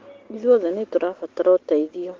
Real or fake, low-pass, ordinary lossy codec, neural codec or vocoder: real; 7.2 kHz; Opus, 16 kbps; none